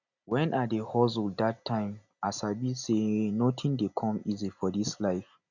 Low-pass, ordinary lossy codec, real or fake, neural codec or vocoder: 7.2 kHz; none; real; none